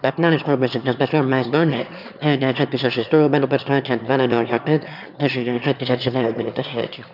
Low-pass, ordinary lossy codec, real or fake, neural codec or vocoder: 5.4 kHz; none; fake; autoencoder, 22.05 kHz, a latent of 192 numbers a frame, VITS, trained on one speaker